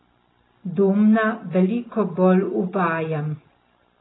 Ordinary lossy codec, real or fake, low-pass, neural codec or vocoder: AAC, 16 kbps; real; 7.2 kHz; none